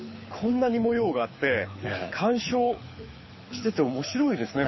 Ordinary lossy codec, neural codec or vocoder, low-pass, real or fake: MP3, 24 kbps; codec, 24 kHz, 6 kbps, HILCodec; 7.2 kHz; fake